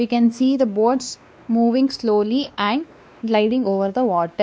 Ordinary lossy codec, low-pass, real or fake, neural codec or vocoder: none; none; fake; codec, 16 kHz, 2 kbps, X-Codec, WavLM features, trained on Multilingual LibriSpeech